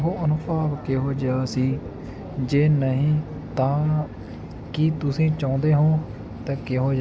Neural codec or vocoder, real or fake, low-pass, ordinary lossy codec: none; real; none; none